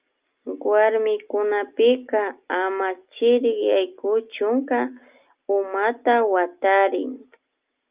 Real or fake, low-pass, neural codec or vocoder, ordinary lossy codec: real; 3.6 kHz; none; Opus, 24 kbps